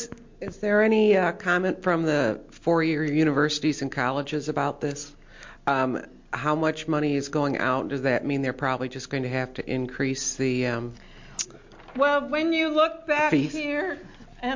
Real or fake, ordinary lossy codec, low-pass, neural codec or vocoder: real; MP3, 48 kbps; 7.2 kHz; none